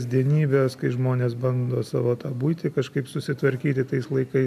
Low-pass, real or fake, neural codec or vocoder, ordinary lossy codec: 14.4 kHz; real; none; AAC, 96 kbps